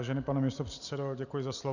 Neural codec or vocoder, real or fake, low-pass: none; real; 7.2 kHz